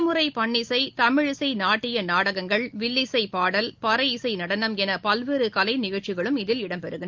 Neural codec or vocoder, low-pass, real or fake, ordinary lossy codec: none; 7.2 kHz; real; Opus, 32 kbps